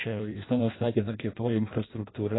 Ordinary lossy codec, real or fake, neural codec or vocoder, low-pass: AAC, 16 kbps; fake; codec, 16 kHz in and 24 kHz out, 0.6 kbps, FireRedTTS-2 codec; 7.2 kHz